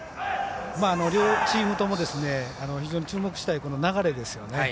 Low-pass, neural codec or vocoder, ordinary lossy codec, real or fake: none; none; none; real